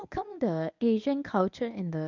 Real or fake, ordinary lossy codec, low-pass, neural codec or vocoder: fake; none; 7.2 kHz; codec, 24 kHz, 0.9 kbps, WavTokenizer, medium speech release version 1